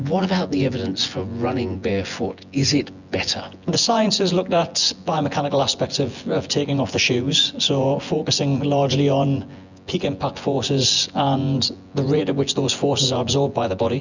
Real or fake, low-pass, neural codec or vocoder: fake; 7.2 kHz; vocoder, 24 kHz, 100 mel bands, Vocos